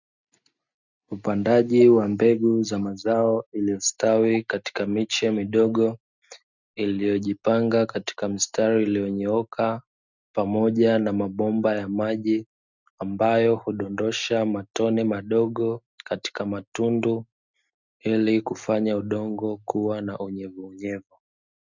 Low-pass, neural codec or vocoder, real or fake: 7.2 kHz; none; real